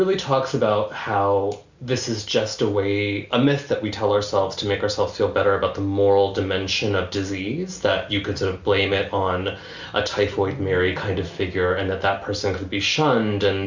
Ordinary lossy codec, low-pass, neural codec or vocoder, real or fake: Opus, 64 kbps; 7.2 kHz; none; real